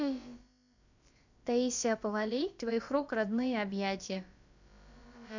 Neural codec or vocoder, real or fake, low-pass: codec, 16 kHz, about 1 kbps, DyCAST, with the encoder's durations; fake; 7.2 kHz